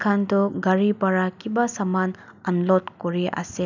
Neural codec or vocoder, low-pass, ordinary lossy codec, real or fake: none; 7.2 kHz; none; real